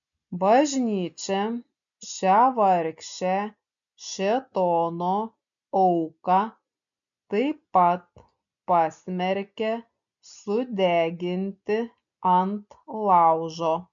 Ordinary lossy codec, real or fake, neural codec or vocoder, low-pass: MP3, 96 kbps; real; none; 7.2 kHz